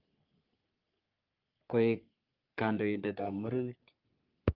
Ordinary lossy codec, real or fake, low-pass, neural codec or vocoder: Opus, 24 kbps; fake; 5.4 kHz; codec, 44.1 kHz, 3.4 kbps, Pupu-Codec